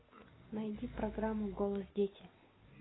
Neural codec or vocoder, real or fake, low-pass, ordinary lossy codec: none; real; 7.2 kHz; AAC, 16 kbps